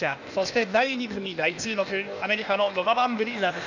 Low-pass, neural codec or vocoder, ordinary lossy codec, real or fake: 7.2 kHz; codec, 16 kHz, 0.8 kbps, ZipCodec; none; fake